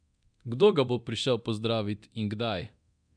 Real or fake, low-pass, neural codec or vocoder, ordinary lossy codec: fake; 9.9 kHz; codec, 24 kHz, 0.9 kbps, DualCodec; none